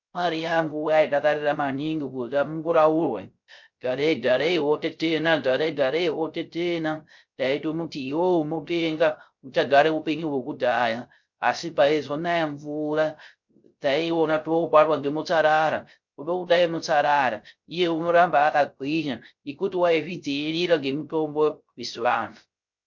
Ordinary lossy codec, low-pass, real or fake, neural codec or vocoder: MP3, 48 kbps; 7.2 kHz; fake; codec, 16 kHz, 0.3 kbps, FocalCodec